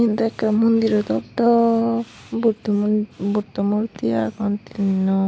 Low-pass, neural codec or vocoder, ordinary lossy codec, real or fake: none; none; none; real